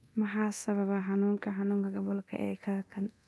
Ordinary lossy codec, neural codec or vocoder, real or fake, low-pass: none; codec, 24 kHz, 0.9 kbps, DualCodec; fake; none